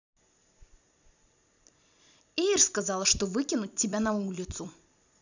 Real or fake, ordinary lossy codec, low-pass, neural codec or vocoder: fake; none; 7.2 kHz; vocoder, 44.1 kHz, 128 mel bands every 256 samples, BigVGAN v2